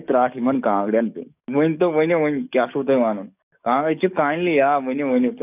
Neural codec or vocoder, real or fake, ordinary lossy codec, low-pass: codec, 44.1 kHz, 7.8 kbps, DAC; fake; none; 3.6 kHz